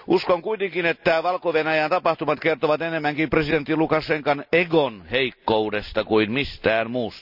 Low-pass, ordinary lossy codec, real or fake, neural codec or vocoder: 5.4 kHz; none; real; none